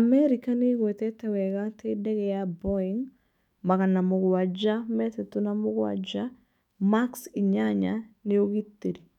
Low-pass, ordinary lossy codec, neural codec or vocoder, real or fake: 19.8 kHz; none; autoencoder, 48 kHz, 128 numbers a frame, DAC-VAE, trained on Japanese speech; fake